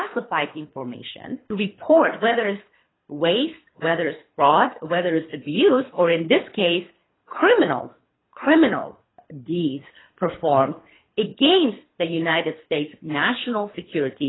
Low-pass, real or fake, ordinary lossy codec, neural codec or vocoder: 7.2 kHz; fake; AAC, 16 kbps; codec, 24 kHz, 3 kbps, HILCodec